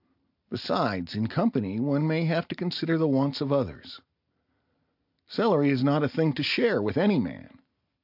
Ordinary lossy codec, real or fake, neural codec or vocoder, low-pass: AAC, 48 kbps; real; none; 5.4 kHz